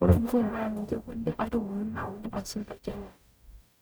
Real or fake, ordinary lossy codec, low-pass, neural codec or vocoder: fake; none; none; codec, 44.1 kHz, 0.9 kbps, DAC